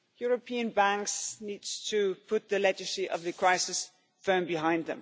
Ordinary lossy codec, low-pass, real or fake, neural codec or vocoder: none; none; real; none